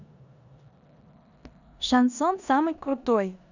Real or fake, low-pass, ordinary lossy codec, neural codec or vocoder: fake; 7.2 kHz; none; codec, 16 kHz in and 24 kHz out, 0.9 kbps, LongCat-Audio-Codec, four codebook decoder